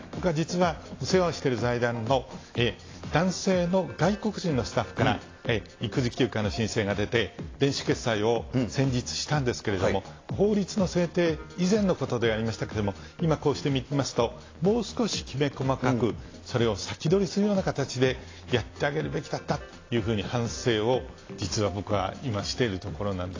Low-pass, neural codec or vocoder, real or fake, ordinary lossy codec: 7.2 kHz; none; real; AAC, 32 kbps